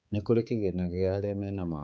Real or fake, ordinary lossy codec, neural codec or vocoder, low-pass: fake; none; codec, 16 kHz, 4 kbps, X-Codec, HuBERT features, trained on balanced general audio; none